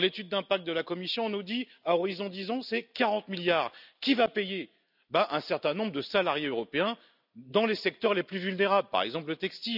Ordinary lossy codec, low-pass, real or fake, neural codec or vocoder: none; 5.4 kHz; real; none